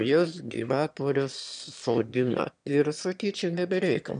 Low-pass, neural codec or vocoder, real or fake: 9.9 kHz; autoencoder, 22.05 kHz, a latent of 192 numbers a frame, VITS, trained on one speaker; fake